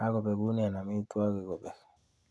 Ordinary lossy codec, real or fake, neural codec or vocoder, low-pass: none; real; none; none